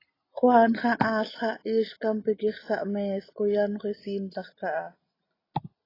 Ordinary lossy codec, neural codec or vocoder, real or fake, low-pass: AAC, 24 kbps; none; real; 5.4 kHz